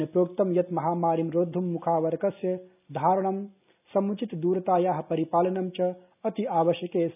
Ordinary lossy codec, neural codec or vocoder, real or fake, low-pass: none; none; real; 3.6 kHz